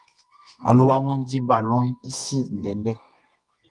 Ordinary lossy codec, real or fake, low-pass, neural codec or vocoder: Opus, 32 kbps; fake; 10.8 kHz; codec, 24 kHz, 0.9 kbps, WavTokenizer, medium music audio release